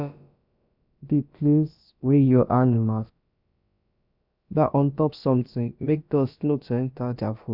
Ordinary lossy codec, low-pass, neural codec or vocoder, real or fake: none; 5.4 kHz; codec, 16 kHz, about 1 kbps, DyCAST, with the encoder's durations; fake